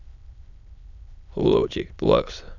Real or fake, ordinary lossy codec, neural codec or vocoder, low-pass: fake; none; autoencoder, 22.05 kHz, a latent of 192 numbers a frame, VITS, trained on many speakers; 7.2 kHz